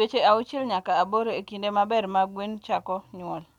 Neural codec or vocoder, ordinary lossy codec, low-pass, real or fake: none; none; 19.8 kHz; real